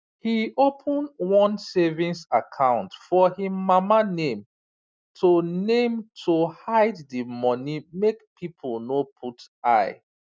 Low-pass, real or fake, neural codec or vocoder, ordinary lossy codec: none; real; none; none